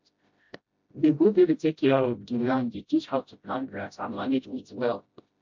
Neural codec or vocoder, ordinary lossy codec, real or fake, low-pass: codec, 16 kHz, 0.5 kbps, FreqCodec, smaller model; MP3, 48 kbps; fake; 7.2 kHz